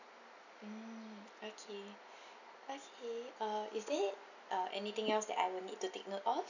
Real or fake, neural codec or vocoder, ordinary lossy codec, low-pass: real; none; none; 7.2 kHz